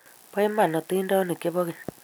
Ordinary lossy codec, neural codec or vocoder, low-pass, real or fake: none; none; none; real